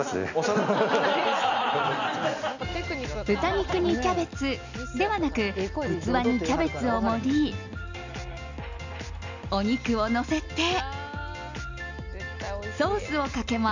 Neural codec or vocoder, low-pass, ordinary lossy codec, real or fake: none; 7.2 kHz; none; real